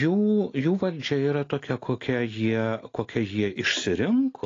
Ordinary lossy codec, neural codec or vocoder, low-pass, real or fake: AAC, 32 kbps; none; 7.2 kHz; real